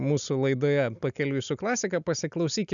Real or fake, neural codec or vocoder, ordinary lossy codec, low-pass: real; none; MP3, 96 kbps; 7.2 kHz